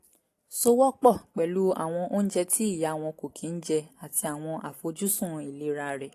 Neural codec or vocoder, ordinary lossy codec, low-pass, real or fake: none; AAC, 48 kbps; 14.4 kHz; real